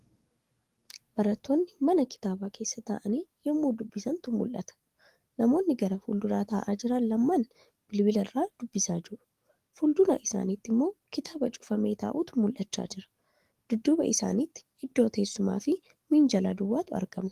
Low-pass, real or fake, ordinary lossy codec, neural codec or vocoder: 14.4 kHz; fake; Opus, 24 kbps; codec, 44.1 kHz, 7.8 kbps, DAC